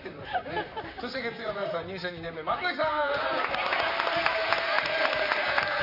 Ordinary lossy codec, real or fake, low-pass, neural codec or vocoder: none; fake; 5.4 kHz; vocoder, 44.1 kHz, 128 mel bands, Pupu-Vocoder